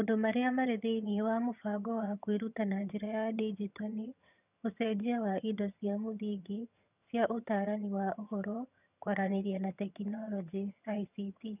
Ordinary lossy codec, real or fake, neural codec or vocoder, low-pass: none; fake; vocoder, 22.05 kHz, 80 mel bands, HiFi-GAN; 3.6 kHz